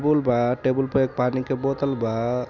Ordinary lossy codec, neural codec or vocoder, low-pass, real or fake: none; none; 7.2 kHz; real